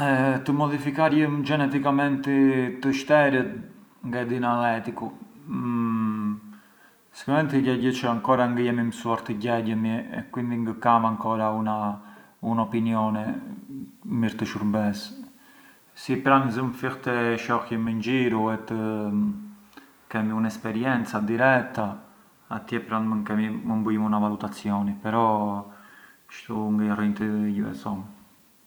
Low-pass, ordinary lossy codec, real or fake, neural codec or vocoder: none; none; real; none